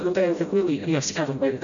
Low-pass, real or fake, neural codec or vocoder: 7.2 kHz; fake; codec, 16 kHz, 0.5 kbps, FreqCodec, smaller model